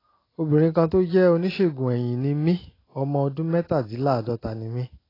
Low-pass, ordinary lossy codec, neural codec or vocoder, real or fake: 5.4 kHz; AAC, 24 kbps; none; real